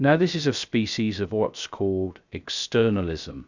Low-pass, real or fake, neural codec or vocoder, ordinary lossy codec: 7.2 kHz; fake; codec, 16 kHz, 0.3 kbps, FocalCodec; Opus, 64 kbps